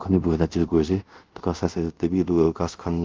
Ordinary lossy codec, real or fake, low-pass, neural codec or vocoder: Opus, 32 kbps; fake; 7.2 kHz; codec, 24 kHz, 0.5 kbps, DualCodec